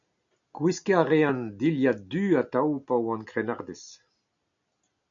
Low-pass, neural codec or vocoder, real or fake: 7.2 kHz; none; real